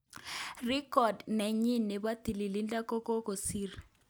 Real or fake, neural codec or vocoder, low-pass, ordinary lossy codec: real; none; none; none